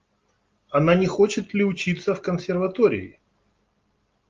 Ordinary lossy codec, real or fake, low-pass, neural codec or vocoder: Opus, 32 kbps; real; 7.2 kHz; none